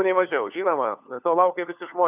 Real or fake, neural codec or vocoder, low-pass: fake; codec, 16 kHz, 4 kbps, FunCodec, trained on LibriTTS, 50 frames a second; 3.6 kHz